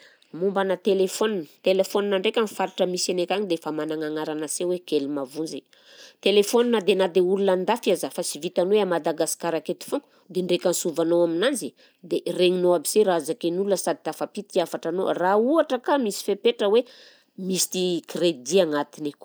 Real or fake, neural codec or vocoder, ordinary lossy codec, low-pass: real; none; none; none